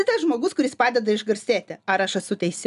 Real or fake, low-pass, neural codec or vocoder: real; 10.8 kHz; none